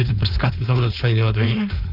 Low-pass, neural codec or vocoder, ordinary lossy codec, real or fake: 5.4 kHz; codec, 16 kHz, 4.8 kbps, FACodec; none; fake